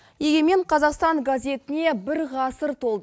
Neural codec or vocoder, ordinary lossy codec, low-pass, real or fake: none; none; none; real